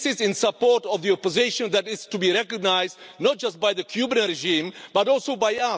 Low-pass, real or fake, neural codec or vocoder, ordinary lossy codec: none; real; none; none